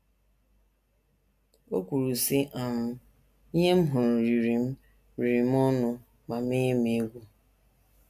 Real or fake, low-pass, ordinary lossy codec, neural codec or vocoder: real; 14.4 kHz; MP3, 96 kbps; none